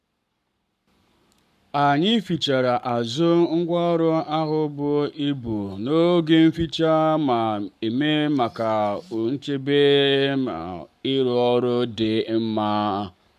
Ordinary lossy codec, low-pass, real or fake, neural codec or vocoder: none; 14.4 kHz; fake; codec, 44.1 kHz, 7.8 kbps, Pupu-Codec